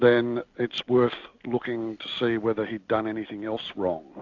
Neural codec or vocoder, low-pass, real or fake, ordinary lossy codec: none; 7.2 kHz; real; AAC, 48 kbps